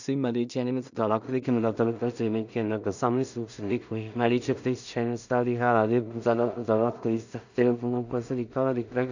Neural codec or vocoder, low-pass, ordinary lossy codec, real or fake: codec, 16 kHz in and 24 kHz out, 0.4 kbps, LongCat-Audio-Codec, two codebook decoder; 7.2 kHz; none; fake